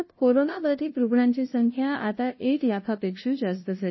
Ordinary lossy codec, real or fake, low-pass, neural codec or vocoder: MP3, 24 kbps; fake; 7.2 kHz; codec, 16 kHz, 0.5 kbps, FunCodec, trained on LibriTTS, 25 frames a second